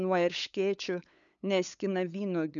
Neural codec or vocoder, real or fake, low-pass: codec, 16 kHz, 8 kbps, FunCodec, trained on LibriTTS, 25 frames a second; fake; 7.2 kHz